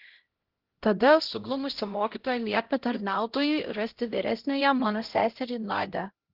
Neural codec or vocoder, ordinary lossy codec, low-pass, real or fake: codec, 16 kHz, 0.5 kbps, X-Codec, HuBERT features, trained on LibriSpeech; Opus, 16 kbps; 5.4 kHz; fake